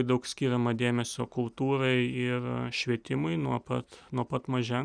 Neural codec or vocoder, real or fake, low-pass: none; real; 9.9 kHz